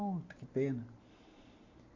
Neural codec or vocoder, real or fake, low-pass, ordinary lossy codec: none; real; 7.2 kHz; AAC, 32 kbps